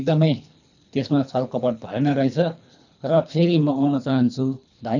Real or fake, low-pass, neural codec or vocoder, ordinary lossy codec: fake; 7.2 kHz; codec, 24 kHz, 3 kbps, HILCodec; none